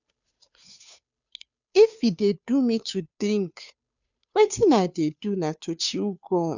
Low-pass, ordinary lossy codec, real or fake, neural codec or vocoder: 7.2 kHz; none; fake; codec, 16 kHz, 2 kbps, FunCodec, trained on Chinese and English, 25 frames a second